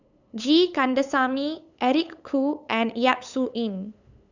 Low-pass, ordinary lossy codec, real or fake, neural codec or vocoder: 7.2 kHz; none; fake; codec, 16 kHz, 8 kbps, FunCodec, trained on LibriTTS, 25 frames a second